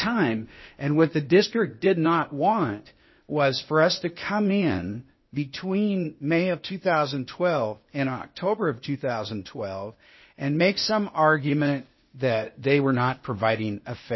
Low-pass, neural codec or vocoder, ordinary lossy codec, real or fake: 7.2 kHz; codec, 16 kHz, 0.8 kbps, ZipCodec; MP3, 24 kbps; fake